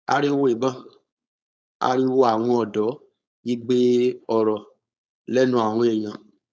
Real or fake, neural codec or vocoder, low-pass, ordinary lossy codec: fake; codec, 16 kHz, 4.8 kbps, FACodec; none; none